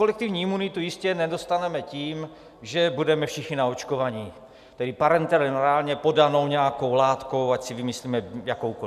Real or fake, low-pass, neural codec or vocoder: real; 14.4 kHz; none